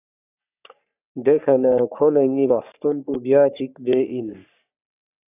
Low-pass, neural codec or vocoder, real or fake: 3.6 kHz; codec, 44.1 kHz, 3.4 kbps, Pupu-Codec; fake